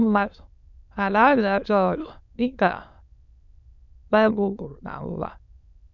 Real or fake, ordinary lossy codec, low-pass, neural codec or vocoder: fake; none; 7.2 kHz; autoencoder, 22.05 kHz, a latent of 192 numbers a frame, VITS, trained on many speakers